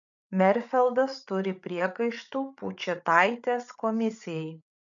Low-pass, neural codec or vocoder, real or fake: 7.2 kHz; codec, 16 kHz, 8 kbps, FreqCodec, larger model; fake